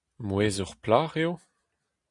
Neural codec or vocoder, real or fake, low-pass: none; real; 10.8 kHz